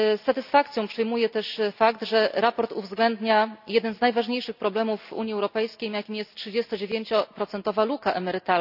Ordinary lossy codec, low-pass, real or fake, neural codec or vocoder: none; 5.4 kHz; real; none